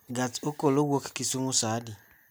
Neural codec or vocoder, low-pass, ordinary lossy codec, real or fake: none; none; none; real